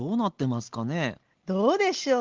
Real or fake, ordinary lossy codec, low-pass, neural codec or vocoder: real; Opus, 16 kbps; 7.2 kHz; none